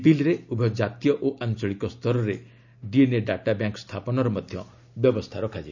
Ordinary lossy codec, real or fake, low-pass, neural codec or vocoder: none; real; 7.2 kHz; none